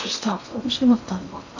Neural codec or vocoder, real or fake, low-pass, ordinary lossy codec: codec, 16 kHz in and 24 kHz out, 0.8 kbps, FocalCodec, streaming, 65536 codes; fake; 7.2 kHz; AAC, 48 kbps